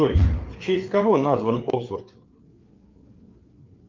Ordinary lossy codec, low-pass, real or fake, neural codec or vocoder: Opus, 32 kbps; 7.2 kHz; fake; codec, 16 kHz, 4 kbps, FreqCodec, larger model